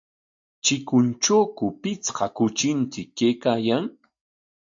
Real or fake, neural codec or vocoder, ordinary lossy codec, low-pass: real; none; AAC, 64 kbps; 7.2 kHz